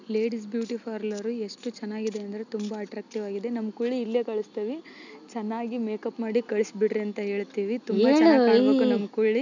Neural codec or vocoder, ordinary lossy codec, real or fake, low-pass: none; none; real; 7.2 kHz